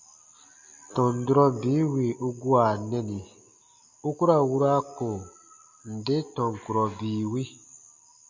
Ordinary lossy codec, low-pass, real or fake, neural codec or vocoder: MP3, 64 kbps; 7.2 kHz; real; none